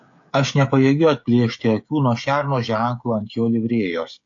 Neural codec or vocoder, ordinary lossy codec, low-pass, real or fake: codec, 16 kHz, 16 kbps, FreqCodec, smaller model; AAC, 48 kbps; 7.2 kHz; fake